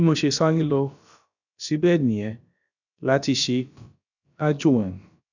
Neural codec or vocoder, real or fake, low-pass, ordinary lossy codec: codec, 16 kHz, about 1 kbps, DyCAST, with the encoder's durations; fake; 7.2 kHz; none